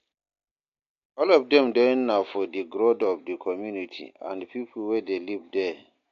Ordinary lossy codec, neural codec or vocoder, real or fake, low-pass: AAC, 48 kbps; none; real; 7.2 kHz